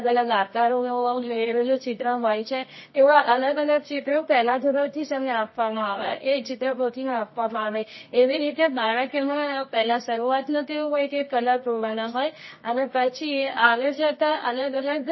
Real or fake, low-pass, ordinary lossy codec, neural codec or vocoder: fake; 7.2 kHz; MP3, 24 kbps; codec, 24 kHz, 0.9 kbps, WavTokenizer, medium music audio release